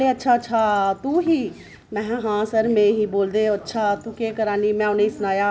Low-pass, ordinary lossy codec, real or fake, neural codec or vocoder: none; none; real; none